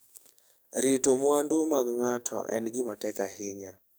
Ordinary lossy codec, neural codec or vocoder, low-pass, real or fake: none; codec, 44.1 kHz, 2.6 kbps, SNAC; none; fake